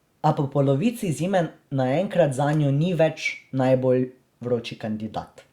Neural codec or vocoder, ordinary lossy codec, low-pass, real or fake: none; Opus, 64 kbps; 19.8 kHz; real